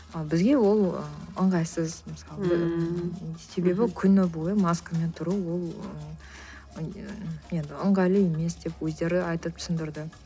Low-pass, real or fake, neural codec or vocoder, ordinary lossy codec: none; real; none; none